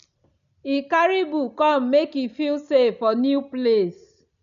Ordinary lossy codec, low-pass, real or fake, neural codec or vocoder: none; 7.2 kHz; real; none